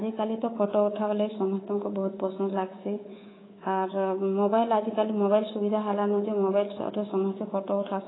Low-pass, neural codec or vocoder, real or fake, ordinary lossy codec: 7.2 kHz; codec, 24 kHz, 3.1 kbps, DualCodec; fake; AAC, 16 kbps